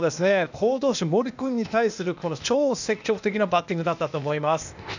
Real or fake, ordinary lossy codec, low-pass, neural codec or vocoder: fake; none; 7.2 kHz; codec, 16 kHz, 0.8 kbps, ZipCodec